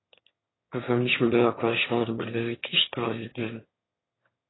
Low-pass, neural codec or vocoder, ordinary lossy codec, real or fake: 7.2 kHz; autoencoder, 22.05 kHz, a latent of 192 numbers a frame, VITS, trained on one speaker; AAC, 16 kbps; fake